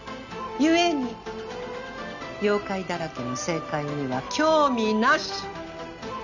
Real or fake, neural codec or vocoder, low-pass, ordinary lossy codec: real; none; 7.2 kHz; none